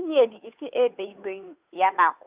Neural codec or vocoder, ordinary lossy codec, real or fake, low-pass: codec, 16 kHz, 2 kbps, FunCodec, trained on Chinese and English, 25 frames a second; Opus, 64 kbps; fake; 3.6 kHz